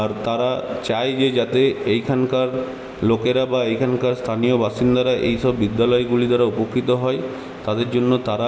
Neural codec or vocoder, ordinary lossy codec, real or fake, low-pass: none; none; real; none